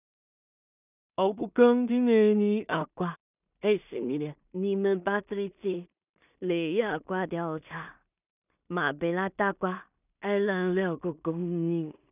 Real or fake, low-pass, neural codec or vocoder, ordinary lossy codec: fake; 3.6 kHz; codec, 16 kHz in and 24 kHz out, 0.4 kbps, LongCat-Audio-Codec, two codebook decoder; none